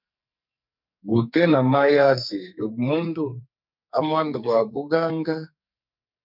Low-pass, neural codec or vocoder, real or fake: 5.4 kHz; codec, 44.1 kHz, 2.6 kbps, SNAC; fake